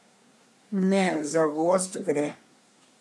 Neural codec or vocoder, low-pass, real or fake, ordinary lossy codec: codec, 24 kHz, 1 kbps, SNAC; none; fake; none